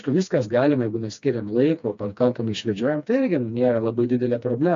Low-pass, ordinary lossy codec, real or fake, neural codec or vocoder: 7.2 kHz; AAC, 64 kbps; fake; codec, 16 kHz, 2 kbps, FreqCodec, smaller model